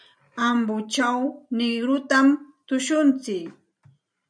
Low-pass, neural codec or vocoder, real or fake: 9.9 kHz; vocoder, 44.1 kHz, 128 mel bands every 512 samples, BigVGAN v2; fake